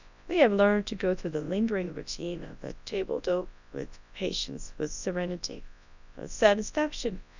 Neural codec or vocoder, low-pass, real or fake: codec, 24 kHz, 0.9 kbps, WavTokenizer, large speech release; 7.2 kHz; fake